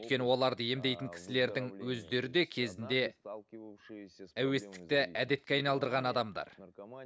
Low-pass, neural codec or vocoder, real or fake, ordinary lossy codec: none; none; real; none